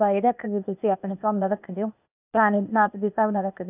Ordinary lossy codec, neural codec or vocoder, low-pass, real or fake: none; codec, 16 kHz, 0.8 kbps, ZipCodec; 3.6 kHz; fake